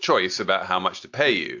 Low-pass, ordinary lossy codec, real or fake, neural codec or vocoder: 7.2 kHz; AAC, 48 kbps; real; none